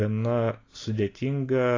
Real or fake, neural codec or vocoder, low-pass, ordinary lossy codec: real; none; 7.2 kHz; AAC, 32 kbps